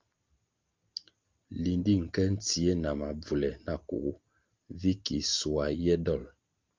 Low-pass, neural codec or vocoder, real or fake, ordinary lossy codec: 7.2 kHz; none; real; Opus, 32 kbps